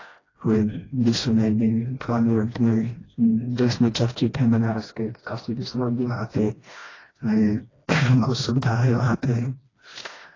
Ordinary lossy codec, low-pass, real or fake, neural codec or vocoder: AAC, 32 kbps; 7.2 kHz; fake; codec, 16 kHz, 1 kbps, FreqCodec, smaller model